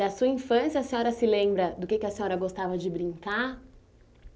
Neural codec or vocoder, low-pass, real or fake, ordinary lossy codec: none; none; real; none